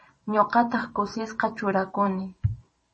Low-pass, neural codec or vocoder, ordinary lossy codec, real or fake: 10.8 kHz; vocoder, 44.1 kHz, 128 mel bands every 512 samples, BigVGAN v2; MP3, 32 kbps; fake